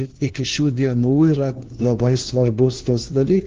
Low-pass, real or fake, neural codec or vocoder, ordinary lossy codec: 7.2 kHz; fake; codec, 16 kHz, 1 kbps, FunCodec, trained on LibriTTS, 50 frames a second; Opus, 16 kbps